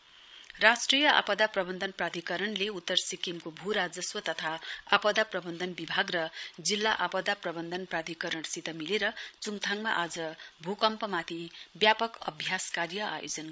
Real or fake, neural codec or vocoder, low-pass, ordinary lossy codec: fake; codec, 16 kHz, 16 kbps, FreqCodec, larger model; none; none